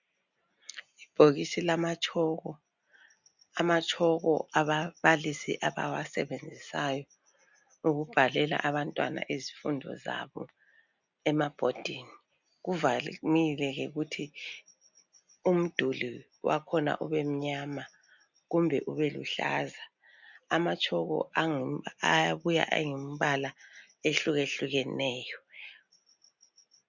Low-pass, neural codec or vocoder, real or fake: 7.2 kHz; none; real